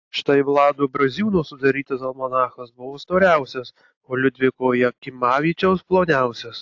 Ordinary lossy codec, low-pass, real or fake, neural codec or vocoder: AAC, 48 kbps; 7.2 kHz; real; none